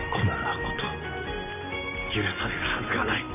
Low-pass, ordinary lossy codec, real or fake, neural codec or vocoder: 3.6 kHz; none; real; none